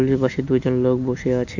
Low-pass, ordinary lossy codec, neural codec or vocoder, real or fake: 7.2 kHz; none; none; real